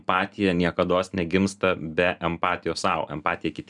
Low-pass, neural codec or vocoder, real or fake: 10.8 kHz; none; real